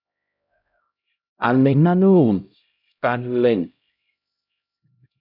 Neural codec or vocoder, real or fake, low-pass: codec, 16 kHz, 0.5 kbps, X-Codec, HuBERT features, trained on LibriSpeech; fake; 5.4 kHz